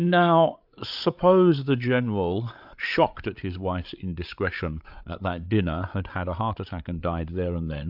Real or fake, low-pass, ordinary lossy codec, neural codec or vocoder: fake; 5.4 kHz; AAC, 48 kbps; codec, 16 kHz, 8 kbps, FreqCodec, larger model